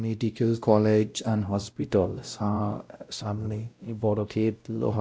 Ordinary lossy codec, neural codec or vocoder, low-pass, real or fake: none; codec, 16 kHz, 0.5 kbps, X-Codec, WavLM features, trained on Multilingual LibriSpeech; none; fake